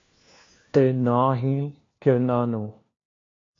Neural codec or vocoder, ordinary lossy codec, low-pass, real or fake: codec, 16 kHz, 1 kbps, FunCodec, trained on LibriTTS, 50 frames a second; AAC, 32 kbps; 7.2 kHz; fake